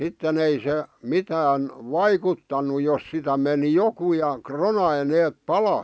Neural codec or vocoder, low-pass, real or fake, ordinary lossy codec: none; none; real; none